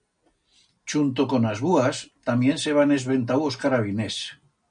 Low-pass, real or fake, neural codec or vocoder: 9.9 kHz; real; none